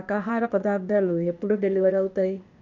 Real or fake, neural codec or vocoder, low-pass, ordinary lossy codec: fake; codec, 16 kHz, 0.8 kbps, ZipCodec; 7.2 kHz; none